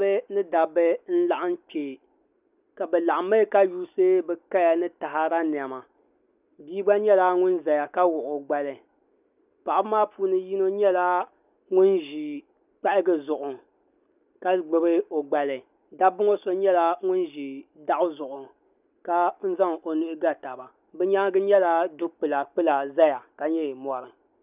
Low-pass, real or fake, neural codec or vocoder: 3.6 kHz; real; none